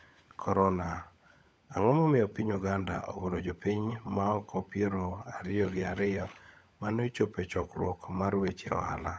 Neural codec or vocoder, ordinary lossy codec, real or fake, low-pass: codec, 16 kHz, 16 kbps, FunCodec, trained on LibriTTS, 50 frames a second; none; fake; none